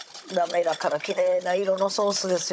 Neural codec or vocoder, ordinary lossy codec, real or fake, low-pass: codec, 16 kHz, 16 kbps, FunCodec, trained on Chinese and English, 50 frames a second; none; fake; none